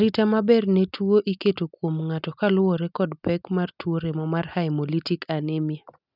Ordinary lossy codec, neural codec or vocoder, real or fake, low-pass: none; none; real; 5.4 kHz